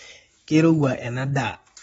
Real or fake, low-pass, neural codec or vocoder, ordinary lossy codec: real; 19.8 kHz; none; AAC, 24 kbps